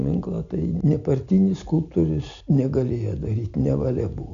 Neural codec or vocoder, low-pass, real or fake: none; 7.2 kHz; real